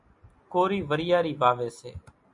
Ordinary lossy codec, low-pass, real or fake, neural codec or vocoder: MP3, 64 kbps; 10.8 kHz; real; none